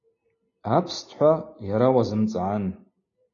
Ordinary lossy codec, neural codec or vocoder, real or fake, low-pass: AAC, 32 kbps; none; real; 7.2 kHz